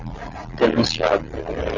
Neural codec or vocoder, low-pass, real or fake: none; 7.2 kHz; real